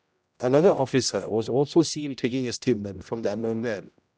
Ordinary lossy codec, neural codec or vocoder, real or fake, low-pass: none; codec, 16 kHz, 0.5 kbps, X-Codec, HuBERT features, trained on general audio; fake; none